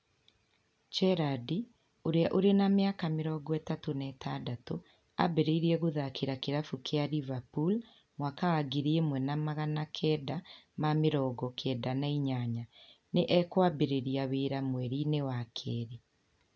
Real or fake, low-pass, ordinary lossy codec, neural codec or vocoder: real; none; none; none